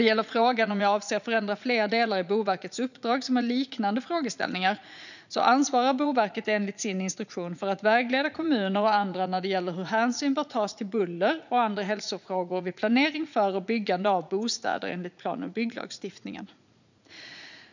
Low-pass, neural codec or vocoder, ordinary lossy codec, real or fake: 7.2 kHz; vocoder, 44.1 kHz, 80 mel bands, Vocos; none; fake